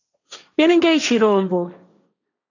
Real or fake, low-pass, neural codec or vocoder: fake; 7.2 kHz; codec, 16 kHz, 1.1 kbps, Voila-Tokenizer